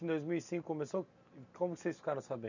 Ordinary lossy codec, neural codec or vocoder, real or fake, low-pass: none; none; real; 7.2 kHz